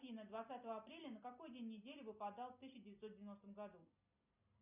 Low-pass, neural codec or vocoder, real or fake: 3.6 kHz; none; real